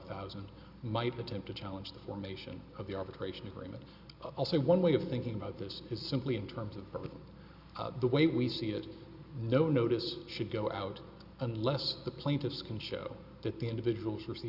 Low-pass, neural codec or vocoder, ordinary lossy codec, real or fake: 5.4 kHz; none; Opus, 64 kbps; real